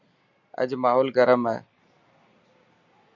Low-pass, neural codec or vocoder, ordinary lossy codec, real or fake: 7.2 kHz; none; Opus, 64 kbps; real